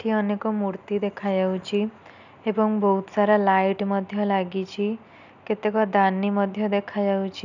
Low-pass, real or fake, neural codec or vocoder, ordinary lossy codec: 7.2 kHz; real; none; none